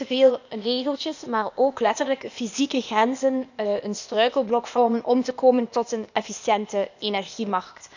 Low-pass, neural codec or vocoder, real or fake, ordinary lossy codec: 7.2 kHz; codec, 16 kHz, 0.8 kbps, ZipCodec; fake; none